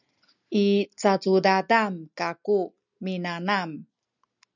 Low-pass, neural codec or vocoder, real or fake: 7.2 kHz; none; real